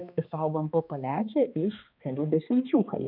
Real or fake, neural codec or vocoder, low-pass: fake; codec, 16 kHz, 2 kbps, X-Codec, HuBERT features, trained on balanced general audio; 5.4 kHz